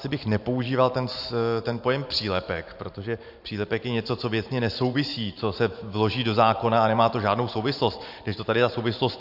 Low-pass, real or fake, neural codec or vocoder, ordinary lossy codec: 5.4 kHz; fake; vocoder, 44.1 kHz, 128 mel bands every 512 samples, BigVGAN v2; AAC, 48 kbps